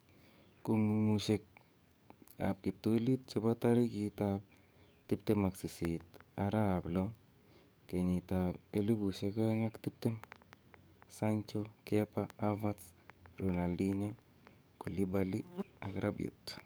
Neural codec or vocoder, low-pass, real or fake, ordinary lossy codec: codec, 44.1 kHz, 7.8 kbps, DAC; none; fake; none